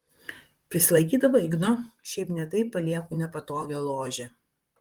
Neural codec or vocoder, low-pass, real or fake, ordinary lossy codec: vocoder, 44.1 kHz, 128 mel bands, Pupu-Vocoder; 19.8 kHz; fake; Opus, 24 kbps